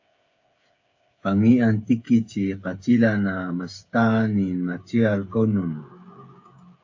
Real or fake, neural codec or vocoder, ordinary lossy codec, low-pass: fake; codec, 16 kHz, 8 kbps, FreqCodec, smaller model; AAC, 48 kbps; 7.2 kHz